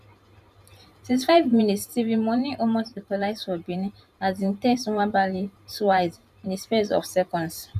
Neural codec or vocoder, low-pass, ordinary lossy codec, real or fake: vocoder, 44.1 kHz, 128 mel bands every 512 samples, BigVGAN v2; 14.4 kHz; none; fake